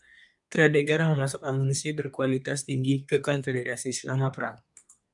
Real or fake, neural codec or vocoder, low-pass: fake; codec, 24 kHz, 1 kbps, SNAC; 10.8 kHz